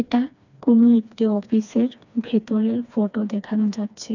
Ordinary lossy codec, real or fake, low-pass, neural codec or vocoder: none; fake; 7.2 kHz; codec, 16 kHz, 2 kbps, FreqCodec, smaller model